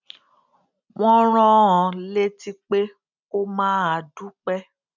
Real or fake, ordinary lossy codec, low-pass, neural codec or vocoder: real; none; 7.2 kHz; none